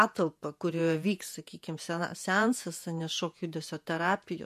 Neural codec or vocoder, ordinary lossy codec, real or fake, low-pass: vocoder, 48 kHz, 128 mel bands, Vocos; MP3, 64 kbps; fake; 14.4 kHz